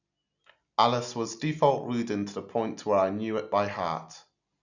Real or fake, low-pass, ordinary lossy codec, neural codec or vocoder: real; 7.2 kHz; none; none